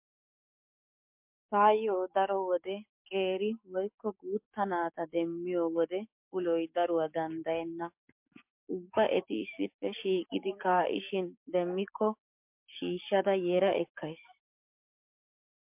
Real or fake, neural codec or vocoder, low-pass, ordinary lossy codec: fake; codec, 44.1 kHz, 7.8 kbps, DAC; 3.6 kHz; MP3, 32 kbps